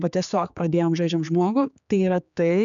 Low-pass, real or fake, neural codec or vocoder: 7.2 kHz; fake; codec, 16 kHz, 4 kbps, X-Codec, HuBERT features, trained on general audio